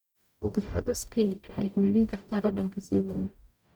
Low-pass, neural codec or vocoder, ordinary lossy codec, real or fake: none; codec, 44.1 kHz, 0.9 kbps, DAC; none; fake